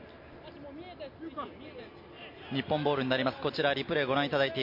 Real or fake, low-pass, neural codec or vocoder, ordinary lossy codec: real; 5.4 kHz; none; MP3, 32 kbps